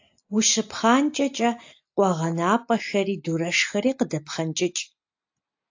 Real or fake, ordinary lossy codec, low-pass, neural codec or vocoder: real; AAC, 48 kbps; 7.2 kHz; none